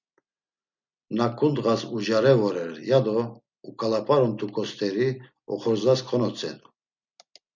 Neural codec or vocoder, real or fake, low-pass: none; real; 7.2 kHz